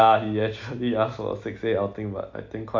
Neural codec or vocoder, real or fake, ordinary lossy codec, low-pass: none; real; AAC, 48 kbps; 7.2 kHz